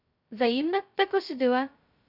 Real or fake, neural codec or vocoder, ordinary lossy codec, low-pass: fake; codec, 16 kHz, 0.2 kbps, FocalCodec; none; 5.4 kHz